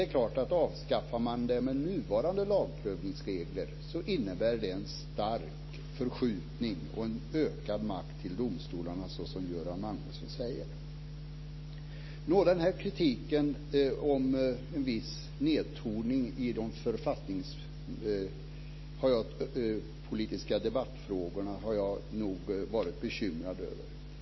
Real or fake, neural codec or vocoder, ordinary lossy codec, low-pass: real; none; MP3, 24 kbps; 7.2 kHz